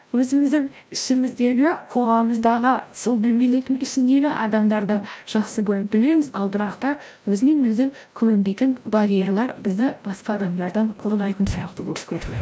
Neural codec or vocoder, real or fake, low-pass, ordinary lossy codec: codec, 16 kHz, 0.5 kbps, FreqCodec, larger model; fake; none; none